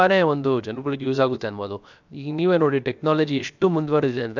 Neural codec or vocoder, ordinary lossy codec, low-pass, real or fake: codec, 16 kHz, 0.7 kbps, FocalCodec; none; 7.2 kHz; fake